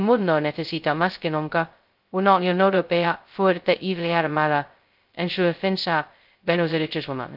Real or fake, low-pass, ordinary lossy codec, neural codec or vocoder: fake; 5.4 kHz; Opus, 32 kbps; codec, 16 kHz, 0.2 kbps, FocalCodec